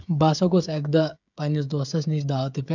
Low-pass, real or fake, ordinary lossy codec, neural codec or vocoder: 7.2 kHz; fake; none; codec, 16 kHz, 16 kbps, FreqCodec, smaller model